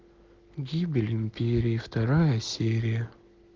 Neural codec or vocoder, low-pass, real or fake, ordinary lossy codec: none; 7.2 kHz; real; Opus, 16 kbps